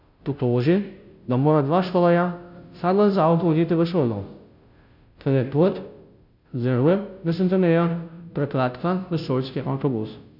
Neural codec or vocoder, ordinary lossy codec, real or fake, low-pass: codec, 16 kHz, 0.5 kbps, FunCodec, trained on Chinese and English, 25 frames a second; none; fake; 5.4 kHz